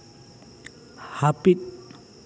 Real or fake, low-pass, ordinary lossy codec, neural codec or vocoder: real; none; none; none